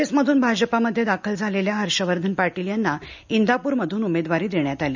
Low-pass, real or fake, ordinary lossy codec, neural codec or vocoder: 7.2 kHz; real; none; none